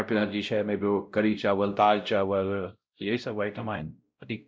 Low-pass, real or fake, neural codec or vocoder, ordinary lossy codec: none; fake; codec, 16 kHz, 0.5 kbps, X-Codec, WavLM features, trained on Multilingual LibriSpeech; none